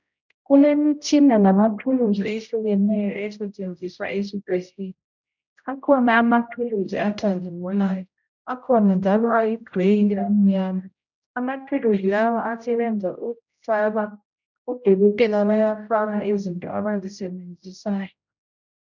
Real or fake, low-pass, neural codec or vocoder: fake; 7.2 kHz; codec, 16 kHz, 0.5 kbps, X-Codec, HuBERT features, trained on general audio